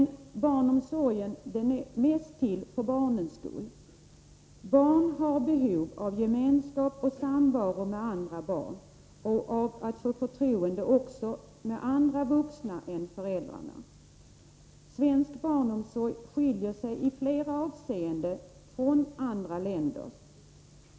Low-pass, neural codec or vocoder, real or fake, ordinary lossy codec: none; none; real; none